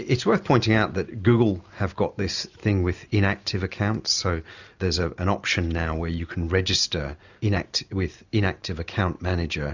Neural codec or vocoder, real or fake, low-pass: none; real; 7.2 kHz